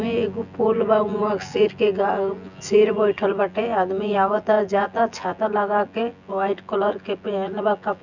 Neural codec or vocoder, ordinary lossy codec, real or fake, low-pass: vocoder, 24 kHz, 100 mel bands, Vocos; none; fake; 7.2 kHz